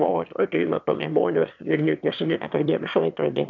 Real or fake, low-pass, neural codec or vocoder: fake; 7.2 kHz; autoencoder, 22.05 kHz, a latent of 192 numbers a frame, VITS, trained on one speaker